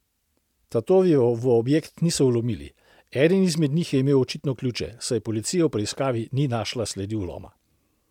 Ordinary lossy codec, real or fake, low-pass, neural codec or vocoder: MP3, 96 kbps; real; 19.8 kHz; none